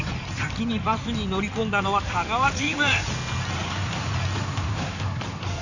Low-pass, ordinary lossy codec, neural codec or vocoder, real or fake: 7.2 kHz; none; codec, 16 kHz in and 24 kHz out, 2.2 kbps, FireRedTTS-2 codec; fake